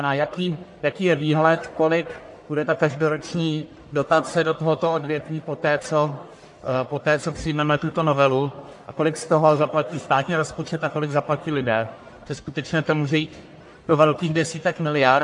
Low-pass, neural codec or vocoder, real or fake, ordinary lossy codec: 10.8 kHz; codec, 44.1 kHz, 1.7 kbps, Pupu-Codec; fake; AAC, 64 kbps